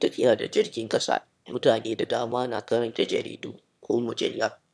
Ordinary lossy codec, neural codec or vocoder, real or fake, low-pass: none; autoencoder, 22.05 kHz, a latent of 192 numbers a frame, VITS, trained on one speaker; fake; none